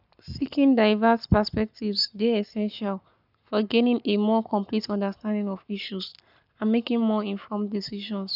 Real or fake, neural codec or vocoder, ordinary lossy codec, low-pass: fake; codec, 44.1 kHz, 7.8 kbps, Pupu-Codec; none; 5.4 kHz